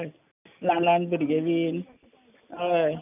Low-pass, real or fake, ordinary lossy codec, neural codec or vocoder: 3.6 kHz; real; none; none